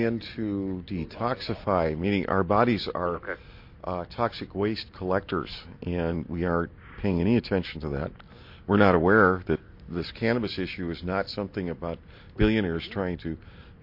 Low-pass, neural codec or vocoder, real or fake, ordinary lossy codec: 5.4 kHz; none; real; MP3, 32 kbps